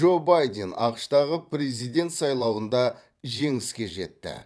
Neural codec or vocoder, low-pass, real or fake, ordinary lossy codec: vocoder, 22.05 kHz, 80 mel bands, Vocos; none; fake; none